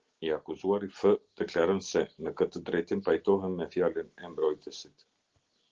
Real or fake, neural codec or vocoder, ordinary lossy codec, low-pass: real; none; Opus, 16 kbps; 7.2 kHz